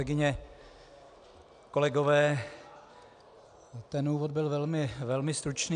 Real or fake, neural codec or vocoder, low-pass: real; none; 9.9 kHz